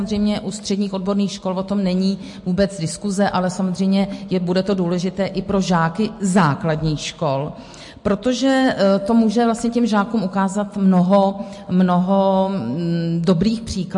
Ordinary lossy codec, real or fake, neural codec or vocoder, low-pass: MP3, 48 kbps; real; none; 10.8 kHz